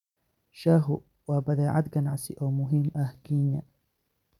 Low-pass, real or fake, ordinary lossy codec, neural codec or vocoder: 19.8 kHz; real; none; none